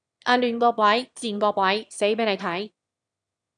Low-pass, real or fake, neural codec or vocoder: 9.9 kHz; fake; autoencoder, 22.05 kHz, a latent of 192 numbers a frame, VITS, trained on one speaker